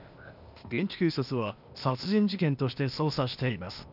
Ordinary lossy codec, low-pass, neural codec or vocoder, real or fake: none; 5.4 kHz; codec, 16 kHz, 0.8 kbps, ZipCodec; fake